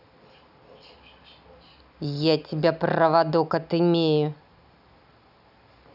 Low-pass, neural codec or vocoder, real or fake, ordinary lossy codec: 5.4 kHz; none; real; none